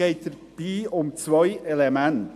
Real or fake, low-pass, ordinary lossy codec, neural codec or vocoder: real; 14.4 kHz; AAC, 96 kbps; none